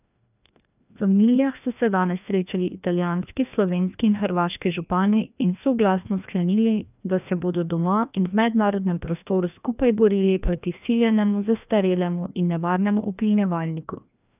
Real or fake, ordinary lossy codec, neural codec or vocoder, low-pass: fake; none; codec, 16 kHz, 1 kbps, FreqCodec, larger model; 3.6 kHz